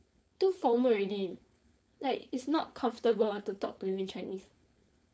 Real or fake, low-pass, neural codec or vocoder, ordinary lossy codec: fake; none; codec, 16 kHz, 4.8 kbps, FACodec; none